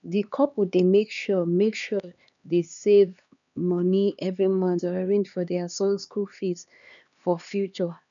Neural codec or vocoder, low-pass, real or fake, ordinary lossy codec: codec, 16 kHz, 2 kbps, X-Codec, HuBERT features, trained on LibriSpeech; 7.2 kHz; fake; none